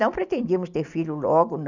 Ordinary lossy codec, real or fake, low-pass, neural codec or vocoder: none; real; 7.2 kHz; none